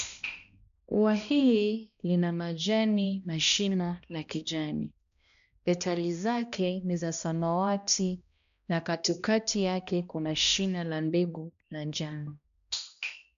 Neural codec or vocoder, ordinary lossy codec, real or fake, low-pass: codec, 16 kHz, 1 kbps, X-Codec, HuBERT features, trained on balanced general audio; none; fake; 7.2 kHz